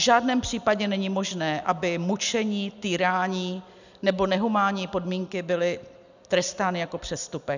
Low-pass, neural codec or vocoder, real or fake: 7.2 kHz; none; real